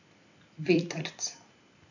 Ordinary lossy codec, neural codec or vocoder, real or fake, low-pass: none; vocoder, 44.1 kHz, 128 mel bands every 256 samples, BigVGAN v2; fake; 7.2 kHz